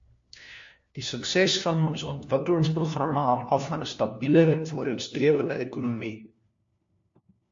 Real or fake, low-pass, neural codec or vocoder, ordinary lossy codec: fake; 7.2 kHz; codec, 16 kHz, 1 kbps, FunCodec, trained on LibriTTS, 50 frames a second; MP3, 48 kbps